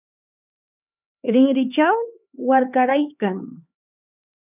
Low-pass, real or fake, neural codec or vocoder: 3.6 kHz; fake; codec, 16 kHz, 4 kbps, X-Codec, HuBERT features, trained on LibriSpeech